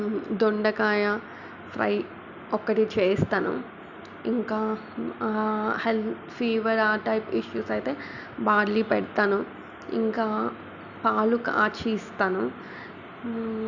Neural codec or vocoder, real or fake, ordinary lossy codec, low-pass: none; real; Opus, 64 kbps; 7.2 kHz